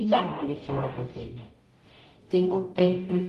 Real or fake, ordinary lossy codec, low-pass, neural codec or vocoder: fake; Opus, 24 kbps; 14.4 kHz; codec, 44.1 kHz, 0.9 kbps, DAC